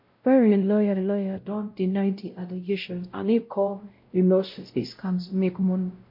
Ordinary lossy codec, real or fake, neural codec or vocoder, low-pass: MP3, 32 kbps; fake; codec, 16 kHz, 0.5 kbps, X-Codec, WavLM features, trained on Multilingual LibriSpeech; 5.4 kHz